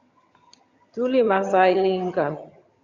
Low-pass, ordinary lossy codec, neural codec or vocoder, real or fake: 7.2 kHz; Opus, 64 kbps; vocoder, 22.05 kHz, 80 mel bands, HiFi-GAN; fake